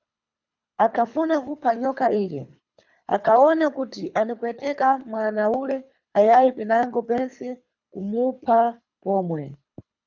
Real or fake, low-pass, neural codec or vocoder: fake; 7.2 kHz; codec, 24 kHz, 3 kbps, HILCodec